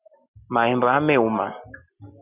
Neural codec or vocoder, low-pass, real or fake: none; 3.6 kHz; real